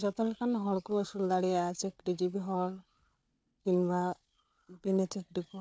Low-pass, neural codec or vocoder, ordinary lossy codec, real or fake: none; codec, 16 kHz, 4 kbps, FunCodec, trained on Chinese and English, 50 frames a second; none; fake